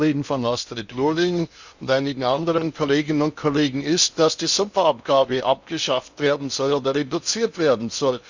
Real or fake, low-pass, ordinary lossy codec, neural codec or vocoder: fake; 7.2 kHz; Opus, 64 kbps; codec, 16 kHz in and 24 kHz out, 0.6 kbps, FocalCodec, streaming, 2048 codes